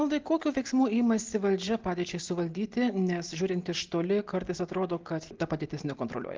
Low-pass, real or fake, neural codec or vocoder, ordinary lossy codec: 7.2 kHz; real; none; Opus, 16 kbps